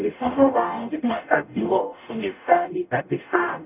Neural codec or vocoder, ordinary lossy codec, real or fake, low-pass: codec, 44.1 kHz, 0.9 kbps, DAC; none; fake; 3.6 kHz